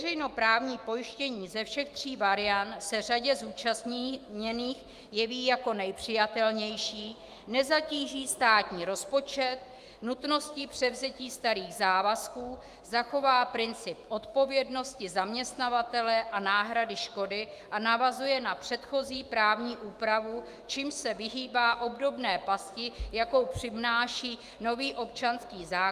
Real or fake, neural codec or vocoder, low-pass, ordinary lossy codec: fake; autoencoder, 48 kHz, 128 numbers a frame, DAC-VAE, trained on Japanese speech; 14.4 kHz; Opus, 32 kbps